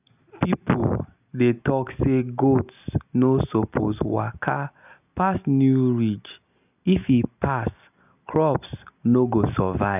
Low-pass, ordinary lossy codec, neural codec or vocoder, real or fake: 3.6 kHz; none; none; real